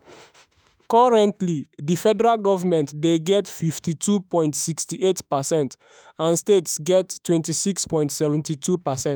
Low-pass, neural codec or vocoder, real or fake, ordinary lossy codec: none; autoencoder, 48 kHz, 32 numbers a frame, DAC-VAE, trained on Japanese speech; fake; none